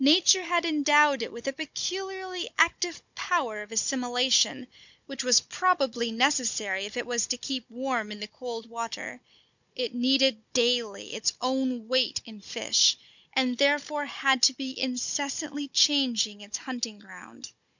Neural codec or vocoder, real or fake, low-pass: codec, 16 kHz, 16 kbps, FunCodec, trained on Chinese and English, 50 frames a second; fake; 7.2 kHz